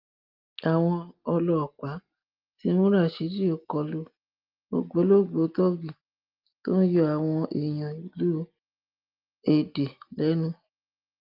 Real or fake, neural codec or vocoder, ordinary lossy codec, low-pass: real; none; Opus, 32 kbps; 5.4 kHz